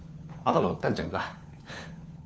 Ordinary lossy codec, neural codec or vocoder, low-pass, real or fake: none; codec, 16 kHz, 4 kbps, FunCodec, trained on LibriTTS, 50 frames a second; none; fake